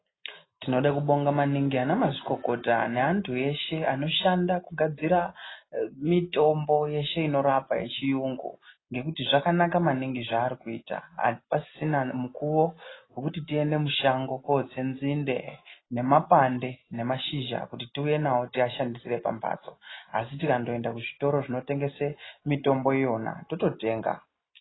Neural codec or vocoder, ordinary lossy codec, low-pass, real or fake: none; AAC, 16 kbps; 7.2 kHz; real